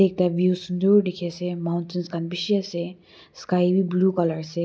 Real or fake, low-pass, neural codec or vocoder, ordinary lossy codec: real; none; none; none